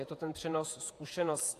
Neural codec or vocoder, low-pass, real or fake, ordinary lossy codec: vocoder, 48 kHz, 128 mel bands, Vocos; 14.4 kHz; fake; AAC, 64 kbps